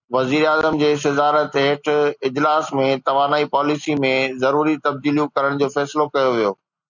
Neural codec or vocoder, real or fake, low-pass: none; real; 7.2 kHz